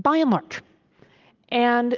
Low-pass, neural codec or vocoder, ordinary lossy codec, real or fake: 7.2 kHz; none; Opus, 24 kbps; real